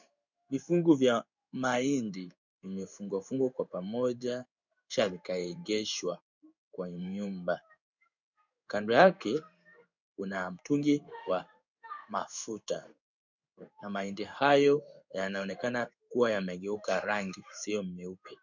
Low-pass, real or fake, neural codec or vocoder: 7.2 kHz; fake; codec, 16 kHz in and 24 kHz out, 1 kbps, XY-Tokenizer